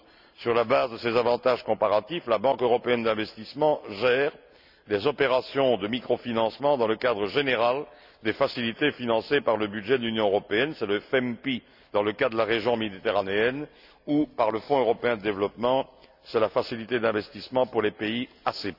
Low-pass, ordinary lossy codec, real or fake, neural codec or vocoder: 5.4 kHz; none; real; none